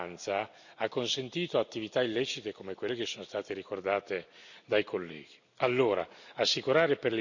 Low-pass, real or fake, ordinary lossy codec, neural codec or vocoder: 7.2 kHz; real; none; none